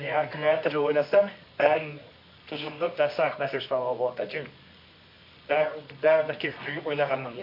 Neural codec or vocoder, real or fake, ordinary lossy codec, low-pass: codec, 24 kHz, 0.9 kbps, WavTokenizer, medium music audio release; fake; MP3, 32 kbps; 5.4 kHz